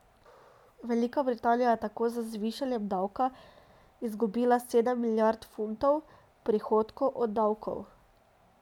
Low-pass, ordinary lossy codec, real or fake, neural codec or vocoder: 19.8 kHz; none; real; none